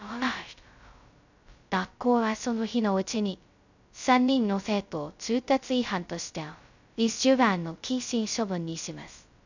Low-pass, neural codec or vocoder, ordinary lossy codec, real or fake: 7.2 kHz; codec, 16 kHz, 0.2 kbps, FocalCodec; none; fake